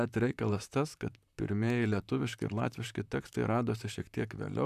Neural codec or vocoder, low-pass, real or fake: vocoder, 44.1 kHz, 128 mel bands, Pupu-Vocoder; 14.4 kHz; fake